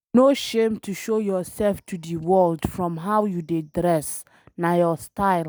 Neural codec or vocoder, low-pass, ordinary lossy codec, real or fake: none; none; none; real